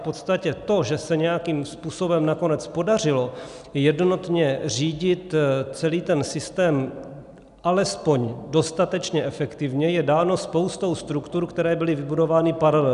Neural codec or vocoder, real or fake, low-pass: none; real; 10.8 kHz